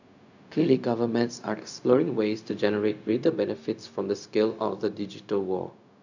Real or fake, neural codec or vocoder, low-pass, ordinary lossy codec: fake; codec, 16 kHz, 0.4 kbps, LongCat-Audio-Codec; 7.2 kHz; none